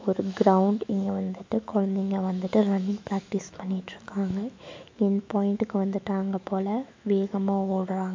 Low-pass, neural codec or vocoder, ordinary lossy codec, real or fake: 7.2 kHz; autoencoder, 48 kHz, 128 numbers a frame, DAC-VAE, trained on Japanese speech; MP3, 64 kbps; fake